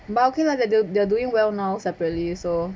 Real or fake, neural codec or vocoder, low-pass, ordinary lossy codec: real; none; none; none